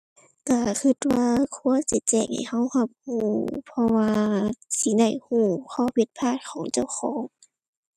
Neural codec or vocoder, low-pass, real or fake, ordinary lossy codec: none; 14.4 kHz; real; none